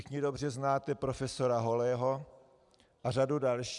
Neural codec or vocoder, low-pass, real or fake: none; 10.8 kHz; real